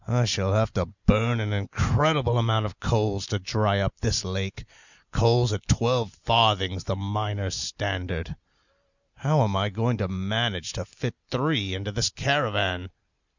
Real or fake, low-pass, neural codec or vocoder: real; 7.2 kHz; none